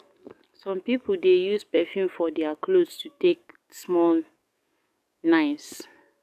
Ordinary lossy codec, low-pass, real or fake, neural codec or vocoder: none; 14.4 kHz; fake; codec, 44.1 kHz, 7.8 kbps, DAC